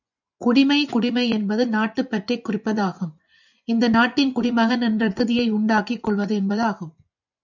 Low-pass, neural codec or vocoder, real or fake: 7.2 kHz; vocoder, 24 kHz, 100 mel bands, Vocos; fake